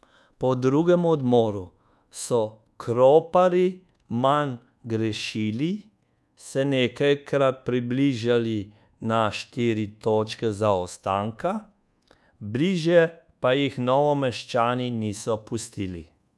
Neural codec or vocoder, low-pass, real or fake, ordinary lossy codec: codec, 24 kHz, 1.2 kbps, DualCodec; none; fake; none